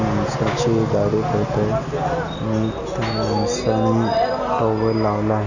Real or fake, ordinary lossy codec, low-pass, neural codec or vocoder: real; none; 7.2 kHz; none